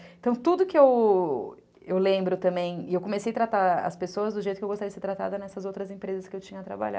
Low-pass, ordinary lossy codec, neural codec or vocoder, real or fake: none; none; none; real